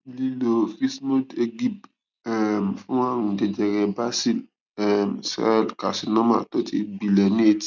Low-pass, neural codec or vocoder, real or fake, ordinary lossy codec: 7.2 kHz; none; real; none